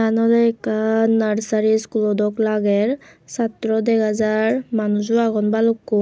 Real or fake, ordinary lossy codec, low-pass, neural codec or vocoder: real; none; none; none